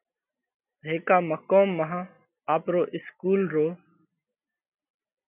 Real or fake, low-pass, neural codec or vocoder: real; 3.6 kHz; none